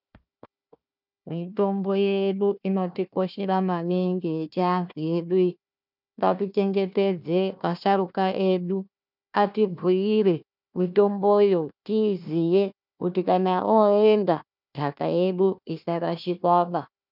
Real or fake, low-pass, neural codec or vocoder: fake; 5.4 kHz; codec, 16 kHz, 1 kbps, FunCodec, trained on Chinese and English, 50 frames a second